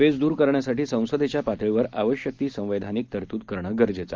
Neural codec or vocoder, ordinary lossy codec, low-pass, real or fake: none; Opus, 32 kbps; 7.2 kHz; real